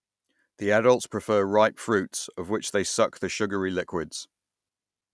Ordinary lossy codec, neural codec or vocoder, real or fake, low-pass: none; none; real; none